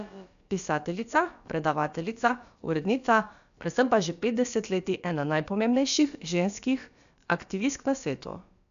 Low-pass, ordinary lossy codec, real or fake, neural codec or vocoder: 7.2 kHz; MP3, 96 kbps; fake; codec, 16 kHz, about 1 kbps, DyCAST, with the encoder's durations